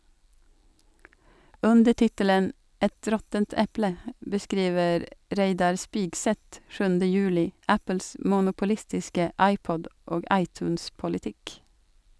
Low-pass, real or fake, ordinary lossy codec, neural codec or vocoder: none; real; none; none